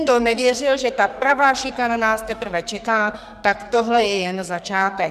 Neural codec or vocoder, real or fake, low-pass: codec, 44.1 kHz, 2.6 kbps, SNAC; fake; 14.4 kHz